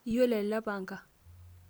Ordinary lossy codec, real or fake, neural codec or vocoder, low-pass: none; real; none; none